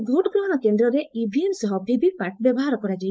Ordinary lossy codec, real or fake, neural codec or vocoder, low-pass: none; fake; codec, 16 kHz, 4.8 kbps, FACodec; none